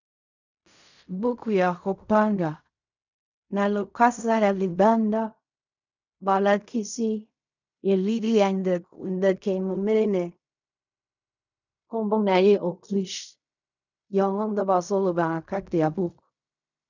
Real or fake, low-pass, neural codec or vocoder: fake; 7.2 kHz; codec, 16 kHz in and 24 kHz out, 0.4 kbps, LongCat-Audio-Codec, fine tuned four codebook decoder